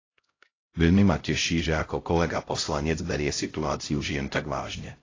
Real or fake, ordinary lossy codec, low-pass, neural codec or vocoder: fake; AAC, 32 kbps; 7.2 kHz; codec, 16 kHz, 0.5 kbps, X-Codec, HuBERT features, trained on LibriSpeech